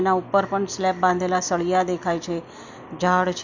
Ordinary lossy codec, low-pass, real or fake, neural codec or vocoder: none; 7.2 kHz; real; none